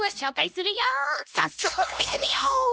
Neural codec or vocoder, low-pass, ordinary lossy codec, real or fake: codec, 16 kHz, 1 kbps, X-Codec, HuBERT features, trained on LibriSpeech; none; none; fake